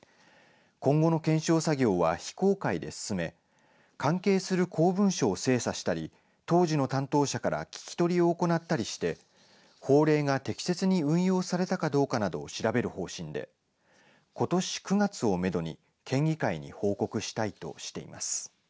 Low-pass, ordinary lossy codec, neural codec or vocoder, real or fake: none; none; none; real